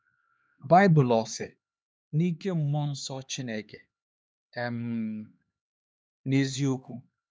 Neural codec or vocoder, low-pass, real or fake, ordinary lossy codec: codec, 16 kHz, 2 kbps, X-Codec, HuBERT features, trained on LibriSpeech; none; fake; none